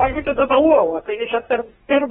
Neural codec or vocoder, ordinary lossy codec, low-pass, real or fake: codec, 24 kHz, 1 kbps, SNAC; AAC, 16 kbps; 10.8 kHz; fake